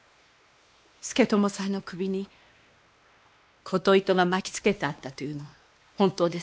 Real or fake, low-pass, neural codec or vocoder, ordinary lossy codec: fake; none; codec, 16 kHz, 2 kbps, X-Codec, WavLM features, trained on Multilingual LibriSpeech; none